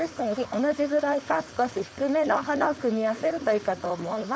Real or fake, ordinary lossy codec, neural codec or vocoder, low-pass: fake; none; codec, 16 kHz, 4.8 kbps, FACodec; none